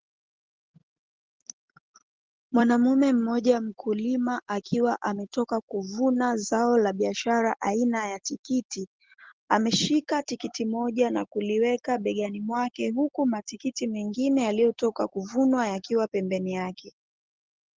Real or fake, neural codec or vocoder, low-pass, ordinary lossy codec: real; none; 7.2 kHz; Opus, 16 kbps